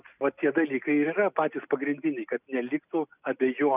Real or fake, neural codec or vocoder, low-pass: real; none; 3.6 kHz